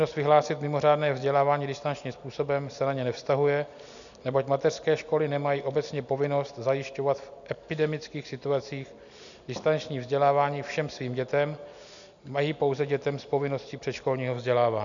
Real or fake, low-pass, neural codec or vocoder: real; 7.2 kHz; none